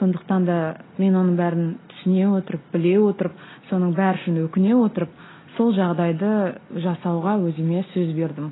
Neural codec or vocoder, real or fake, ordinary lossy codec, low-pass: none; real; AAC, 16 kbps; 7.2 kHz